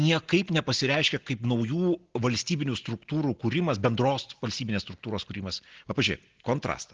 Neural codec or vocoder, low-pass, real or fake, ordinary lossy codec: none; 7.2 kHz; real; Opus, 16 kbps